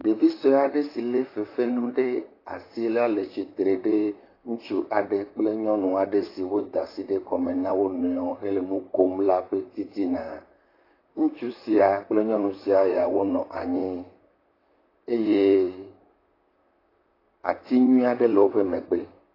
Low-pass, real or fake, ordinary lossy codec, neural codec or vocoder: 5.4 kHz; fake; AAC, 24 kbps; vocoder, 44.1 kHz, 128 mel bands, Pupu-Vocoder